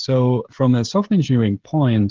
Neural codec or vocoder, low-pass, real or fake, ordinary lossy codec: codec, 16 kHz, 16 kbps, FreqCodec, smaller model; 7.2 kHz; fake; Opus, 16 kbps